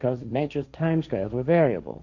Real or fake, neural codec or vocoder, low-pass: fake; codec, 16 kHz, 1.1 kbps, Voila-Tokenizer; 7.2 kHz